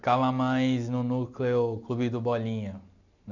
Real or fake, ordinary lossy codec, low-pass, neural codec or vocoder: real; none; 7.2 kHz; none